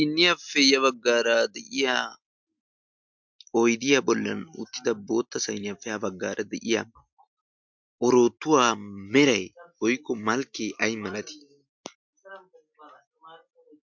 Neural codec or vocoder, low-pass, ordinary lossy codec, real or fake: none; 7.2 kHz; MP3, 64 kbps; real